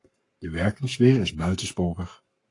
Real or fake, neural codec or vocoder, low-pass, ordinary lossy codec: fake; codec, 44.1 kHz, 7.8 kbps, Pupu-Codec; 10.8 kHz; AAC, 48 kbps